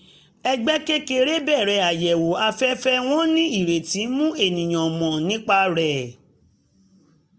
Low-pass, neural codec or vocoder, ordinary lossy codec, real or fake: none; none; none; real